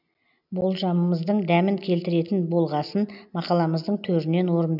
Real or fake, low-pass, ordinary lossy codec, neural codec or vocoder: real; 5.4 kHz; none; none